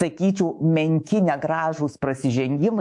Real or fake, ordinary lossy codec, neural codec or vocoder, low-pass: fake; AAC, 64 kbps; codec, 24 kHz, 3.1 kbps, DualCodec; 10.8 kHz